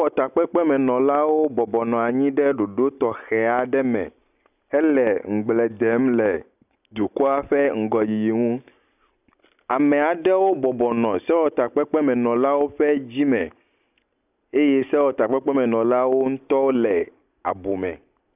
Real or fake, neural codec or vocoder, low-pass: real; none; 3.6 kHz